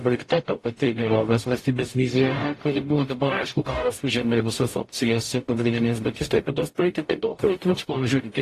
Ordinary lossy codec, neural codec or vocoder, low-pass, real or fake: AAC, 48 kbps; codec, 44.1 kHz, 0.9 kbps, DAC; 14.4 kHz; fake